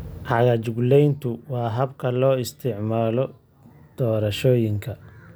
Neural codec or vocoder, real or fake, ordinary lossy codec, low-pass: none; real; none; none